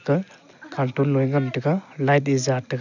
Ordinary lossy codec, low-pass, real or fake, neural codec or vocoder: none; 7.2 kHz; real; none